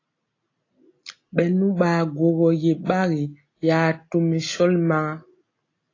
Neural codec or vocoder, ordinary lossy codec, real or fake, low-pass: none; AAC, 32 kbps; real; 7.2 kHz